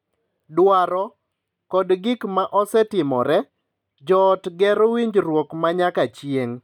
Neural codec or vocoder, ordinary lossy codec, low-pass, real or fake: none; none; 19.8 kHz; real